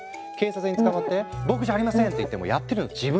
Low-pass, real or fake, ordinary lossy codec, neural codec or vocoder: none; real; none; none